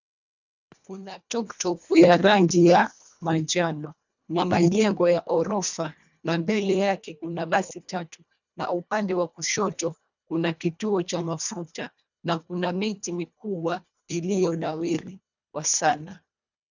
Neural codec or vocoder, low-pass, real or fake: codec, 24 kHz, 1.5 kbps, HILCodec; 7.2 kHz; fake